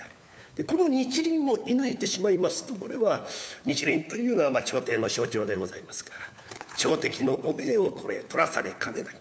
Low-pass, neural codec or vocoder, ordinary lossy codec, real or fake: none; codec, 16 kHz, 4 kbps, FunCodec, trained on LibriTTS, 50 frames a second; none; fake